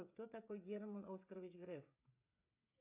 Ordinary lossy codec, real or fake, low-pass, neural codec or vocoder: AAC, 32 kbps; fake; 3.6 kHz; codec, 16 kHz, 16 kbps, FreqCodec, smaller model